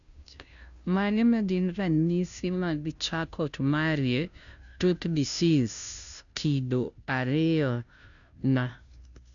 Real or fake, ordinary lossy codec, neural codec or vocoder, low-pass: fake; none; codec, 16 kHz, 0.5 kbps, FunCodec, trained on Chinese and English, 25 frames a second; 7.2 kHz